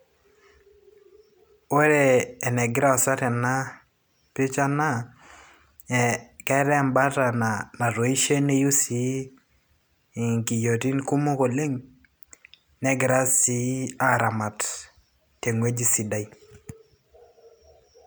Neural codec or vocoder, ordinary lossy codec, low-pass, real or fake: none; none; none; real